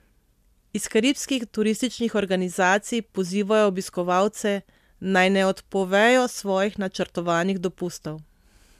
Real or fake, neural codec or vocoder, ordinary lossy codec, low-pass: real; none; MP3, 96 kbps; 14.4 kHz